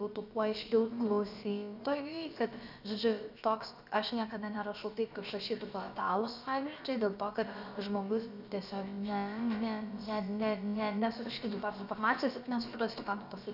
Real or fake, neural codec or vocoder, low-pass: fake; codec, 16 kHz, about 1 kbps, DyCAST, with the encoder's durations; 5.4 kHz